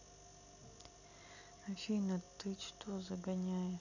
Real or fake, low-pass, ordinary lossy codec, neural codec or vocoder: real; 7.2 kHz; none; none